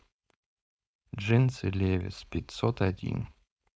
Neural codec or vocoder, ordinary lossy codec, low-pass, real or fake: codec, 16 kHz, 4.8 kbps, FACodec; none; none; fake